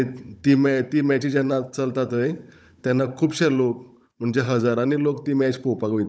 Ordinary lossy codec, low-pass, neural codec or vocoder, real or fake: none; none; codec, 16 kHz, 16 kbps, FunCodec, trained on Chinese and English, 50 frames a second; fake